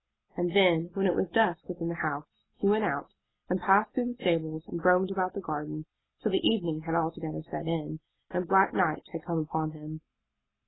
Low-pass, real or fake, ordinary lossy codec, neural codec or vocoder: 7.2 kHz; real; AAC, 16 kbps; none